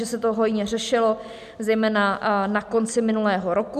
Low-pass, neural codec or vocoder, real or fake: 14.4 kHz; none; real